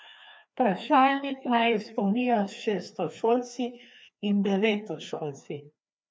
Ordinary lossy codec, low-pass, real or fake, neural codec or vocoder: none; none; fake; codec, 16 kHz, 2 kbps, FreqCodec, larger model